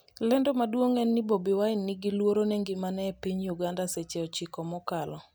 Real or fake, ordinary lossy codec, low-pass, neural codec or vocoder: real; none; none; none